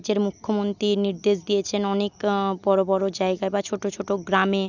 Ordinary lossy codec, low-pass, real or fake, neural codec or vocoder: none; 7.2 kHz; real; none